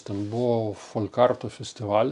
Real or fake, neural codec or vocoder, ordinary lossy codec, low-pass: real; none; MP3, 96 kbps; 10.8 kHz